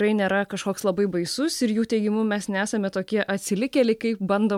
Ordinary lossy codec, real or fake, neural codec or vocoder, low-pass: MP3, 96 kbps; real; none; 19.8 kHz